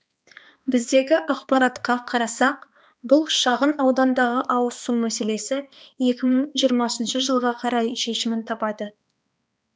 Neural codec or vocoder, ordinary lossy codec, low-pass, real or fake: codec, 16 kHz, 2 kbps, X-Codec, HuBERT features, trained on balanced general audio; none; none; fake